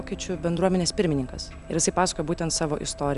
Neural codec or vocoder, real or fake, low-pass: none; real; 10.8 kHz